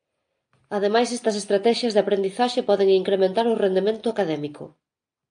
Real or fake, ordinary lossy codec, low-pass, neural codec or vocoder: real; AAC, 64 kbps; 9.9 kHz; none